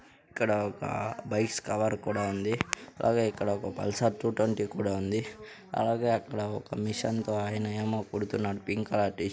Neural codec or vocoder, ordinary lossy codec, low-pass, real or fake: none; none; none; real